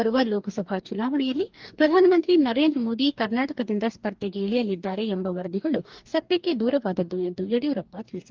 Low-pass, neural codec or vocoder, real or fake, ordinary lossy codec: 7.2 kHz; codec, 44.1 kHz, 2.6 kbps, DAC; fake; Opus, 32 kbps